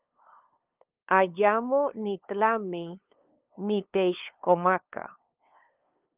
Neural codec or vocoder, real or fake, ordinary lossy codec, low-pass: codec, 16 kHz, 2 kbps, FunCodec, trained on LibriTTS, 25 frames a second; fake; Opus, 24 kbps; 3.6 kHz